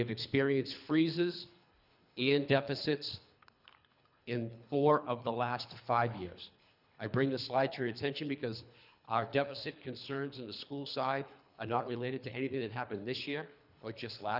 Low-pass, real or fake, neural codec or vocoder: 5.4 kHz; fake; codec, 24 kHz, 3 kbps, HILCodec